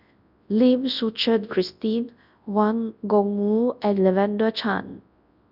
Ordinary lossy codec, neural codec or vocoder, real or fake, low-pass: none; codec, 24 kHz, 0.9 kbps, WavTokenizer, large speech release; fake; 5.4 kHz